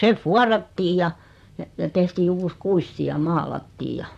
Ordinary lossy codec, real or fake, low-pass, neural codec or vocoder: none; fake; 14.4 kHz; vocoder, 44.1 kHz, 128 mel bands, Pupu-Vocoder